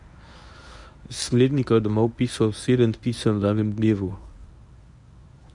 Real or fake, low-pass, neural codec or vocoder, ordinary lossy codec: fake; 10.8 kHz; codec, 24 kHz, 0.9 kbps, WavTokenizer, medium speech release version 1; none